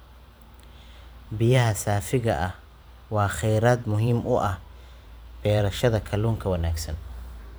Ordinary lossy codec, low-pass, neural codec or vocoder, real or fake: none; none; vocoder, 44.1 kHz, 128 mel bands every 512 samples, BigVGAN v2; fake